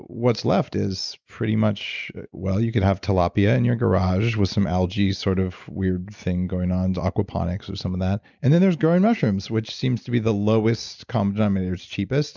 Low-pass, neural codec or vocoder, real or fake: 7.2 kHz; none; real